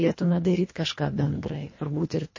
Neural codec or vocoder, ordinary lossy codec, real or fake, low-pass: codec, 24 kHz, 1.5 kbps, HILCodec; MP3, 32 kbps; fake; 7.2 kHz